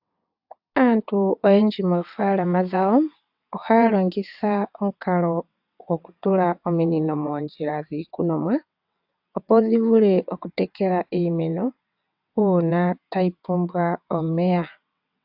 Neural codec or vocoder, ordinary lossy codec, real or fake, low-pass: vocoder, 22.05 kHz, 80 mel bands, WaveNeXt; AAC, 48 kbps; fake; 5.4 kHz